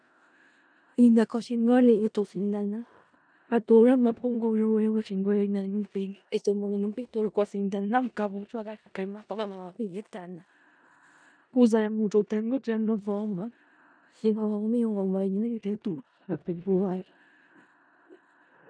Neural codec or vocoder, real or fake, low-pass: codec, 16 kHz in and 24 kHz out, 0.4 kbps, LongCat-Audio-Codec, four codebook decoder; fake; 9.9 kHz